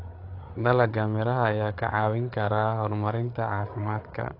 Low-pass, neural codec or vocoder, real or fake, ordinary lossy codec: 5.4 kHz; codec, 16 kHz, 8 kbps, FreqCodec, larger model; fake; AAC, 32 kbps